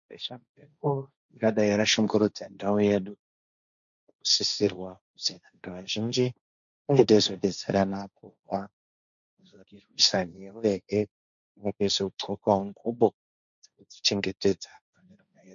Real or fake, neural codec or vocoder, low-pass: fake; codec, 16 kHz, 1.1 kbps, Voila-Tokenizer; 7.2 kHz